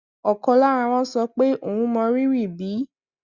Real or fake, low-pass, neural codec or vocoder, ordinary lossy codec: real; 7.2 kHz; none; Opus, 64 kbps